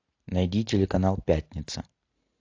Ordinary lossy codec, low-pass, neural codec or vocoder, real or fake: AAC, 48 kbps; 7.2 kHz; none; real